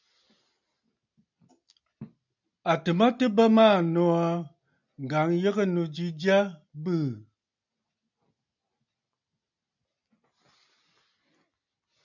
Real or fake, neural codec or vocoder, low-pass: real; none; 7.2 kHz